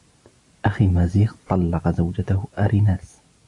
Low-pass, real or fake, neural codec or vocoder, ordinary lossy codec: 10.8 kHz; real; none; AAC, 48 kbps